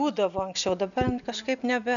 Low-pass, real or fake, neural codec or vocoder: 7.2 kHz; real; none